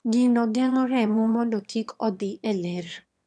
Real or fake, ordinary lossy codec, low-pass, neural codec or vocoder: fake; none; none; autoencoder, 22.05 kHz, a latent of 192 numbers a frame, VITS, trained on one speaker